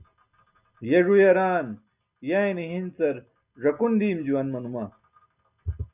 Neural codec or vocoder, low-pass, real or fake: none; 3.6 kHz; real